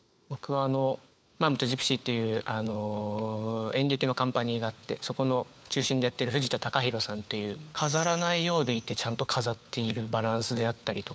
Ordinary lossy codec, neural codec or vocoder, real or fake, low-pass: none; codec, 16 kHz, 4 kbps, FunCodec, trained on LibriTTS, 50 frames a second; fake; none